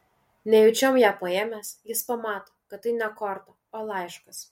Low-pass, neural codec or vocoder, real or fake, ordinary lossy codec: 19.8 kHz; none; real; MP3, 64 kbps